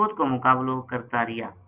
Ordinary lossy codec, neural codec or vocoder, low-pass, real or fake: Opus, 64 kbps; none; 3.6 kHz; real